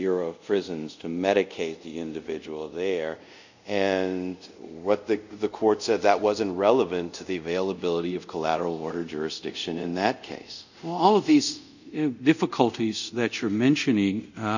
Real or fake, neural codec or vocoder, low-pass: fake; codec, 24 kHz, 0.5 kbps, DualCodec; 7.2 kHz